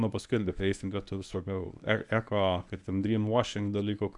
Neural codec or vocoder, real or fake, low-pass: codec, 24 kHz, 0.9 kbps, WavTokenizer, medium speech release version 1; fake; 10.8 kHz